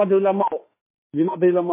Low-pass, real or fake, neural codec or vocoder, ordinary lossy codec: 3.6 kHz; fake; autoencoder, 48 kHz, 32 numbers a frame, DAC-VAE, trained on Japanese speech; MP3, 16 kbps